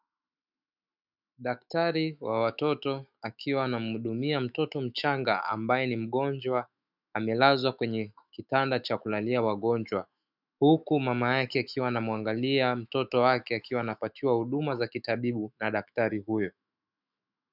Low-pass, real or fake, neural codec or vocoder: 5.4 kHz; fake; autoencoder, 48 kHz, 128 numbers a frame, DAC-VAE, trained on Japanese speech